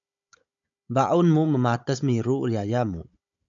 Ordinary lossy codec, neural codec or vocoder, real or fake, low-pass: AAC, 64 kbps; codec, 16 kHz, 16 kbps, FunCodec, trained on Chinese and English, 50 frames a second; fake; 7.2 kHz